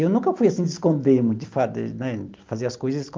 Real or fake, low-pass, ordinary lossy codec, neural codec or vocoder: real; 7.2 kHz; Opus, 32 kbps; none